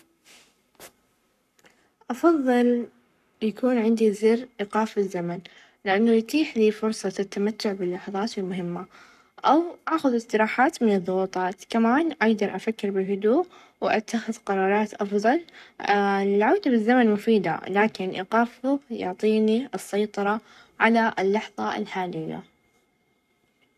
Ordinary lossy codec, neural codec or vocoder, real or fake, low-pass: none; codec, 44.1 kHz, 7.8 kbps, Pupu-Codec; fake; 14.4 kHz